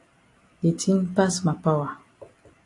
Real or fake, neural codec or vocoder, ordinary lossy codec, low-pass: real; none; AAC, 48 kbps; 10.8 kHz